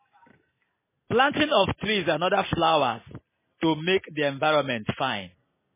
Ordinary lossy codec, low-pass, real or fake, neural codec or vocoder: MP3, 16 kbps; 3.6 kHz; real; none